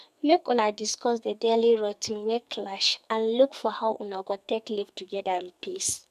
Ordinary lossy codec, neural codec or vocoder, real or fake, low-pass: none; codec, 44.1 kHz, 2.6 kbps, SNAC; fake; 14.4 kHz